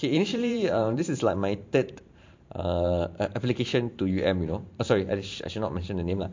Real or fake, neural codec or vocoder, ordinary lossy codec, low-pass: fake; vocoder, 44.1 kHz, 128 mel bands every 512 samples, BigVGAN v2; MP3, 48 kbps; 7.2 kHz